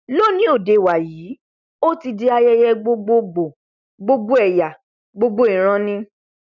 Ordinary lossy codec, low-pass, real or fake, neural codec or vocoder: none; 7.2 kHz; real; none